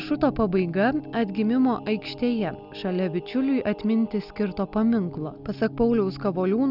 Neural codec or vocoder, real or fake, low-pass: none; real; 5.4 kHz